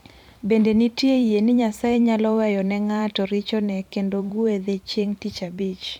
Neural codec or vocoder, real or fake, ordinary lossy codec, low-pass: vocoder, 44.1 kHz, 128 mel bands every 512 samples, BigVGAN v2; fake; none; 19.8 kHz